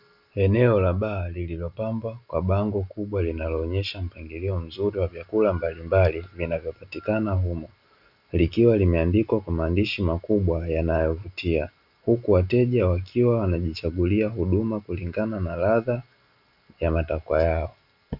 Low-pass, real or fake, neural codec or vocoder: 5.4 kHz; real; none